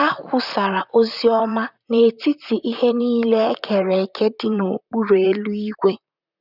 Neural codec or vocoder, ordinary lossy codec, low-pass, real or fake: vocoder, 44.1 kHz, 128 mel bands, Pupu-Vocoder; none; 5.4 kHz; fake